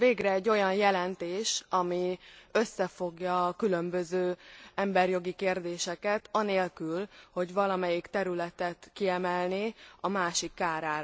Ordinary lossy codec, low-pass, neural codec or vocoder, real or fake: none; none; none; real